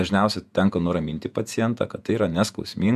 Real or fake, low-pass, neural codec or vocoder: fake; 14.4 kHz; vocoder, 48 kHz, 128 mel bands, Vocos